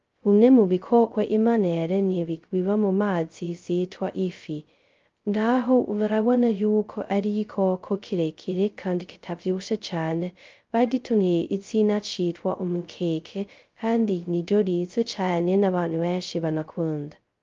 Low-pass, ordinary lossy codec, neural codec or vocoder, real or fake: 7.2 kHz; Opus, 32 kbps; codec, 16 kHz, 0.2 kbps, FocalCodec; fake